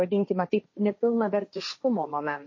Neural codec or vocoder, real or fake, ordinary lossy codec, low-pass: codec, 16 kHz, 1.1 kbps, Voila-Tokenizer; fake; MP3, 32 kbps; 7.2 kHz